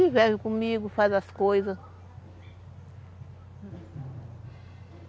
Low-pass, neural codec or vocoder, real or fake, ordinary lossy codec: none; none; real; none